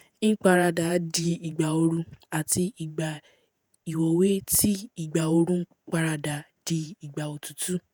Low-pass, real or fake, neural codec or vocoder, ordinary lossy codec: none; fake; vocoder, 48 kHz, 128 mel bands, Vocos; none